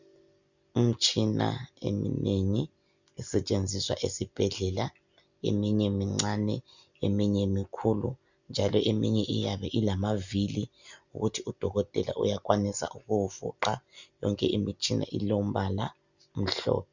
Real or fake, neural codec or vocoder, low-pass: real; none; 7.2 kHz